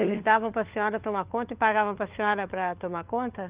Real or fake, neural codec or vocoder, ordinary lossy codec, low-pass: fake; codec, 16 kHz, 4 kbps, FunCodec, trained on LibriTTS, 50 frames a second; Opus, 32 kbps; 3.6 kHz